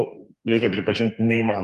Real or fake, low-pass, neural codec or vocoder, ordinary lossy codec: fake; 14.4 kHz; codec, 32 kHz, 1.9 kbps, SNAC; Opus, 32 kbps